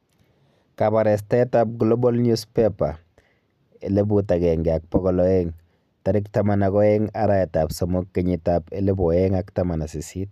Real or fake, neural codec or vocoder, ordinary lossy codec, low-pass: real; none; none; 14.4 kHz